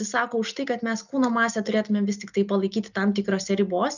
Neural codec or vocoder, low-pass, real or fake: none; 7.2 kHz; real